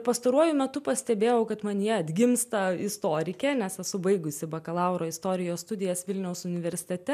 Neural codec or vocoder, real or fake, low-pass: none; real; 14.4 kHz